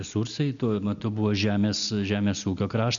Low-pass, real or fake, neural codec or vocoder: 7.2 kHz; real; none